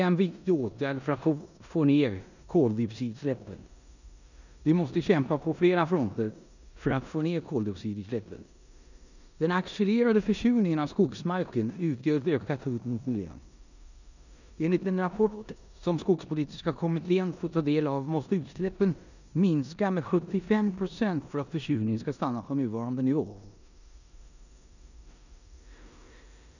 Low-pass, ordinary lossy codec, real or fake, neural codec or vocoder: 7.2 kHz; none; fake; codec, 16 kHz in and 24 kHz out, 0.9 kbps, LongCat-Audio-Codec, four codebook decoder